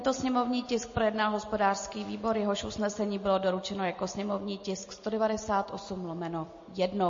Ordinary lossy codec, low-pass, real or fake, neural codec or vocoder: MP3, 32 kbps; 7.2 kHz; real; none